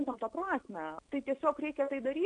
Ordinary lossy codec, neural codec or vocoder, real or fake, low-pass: AAC, 48 kbps; none; real; 9.9 kHz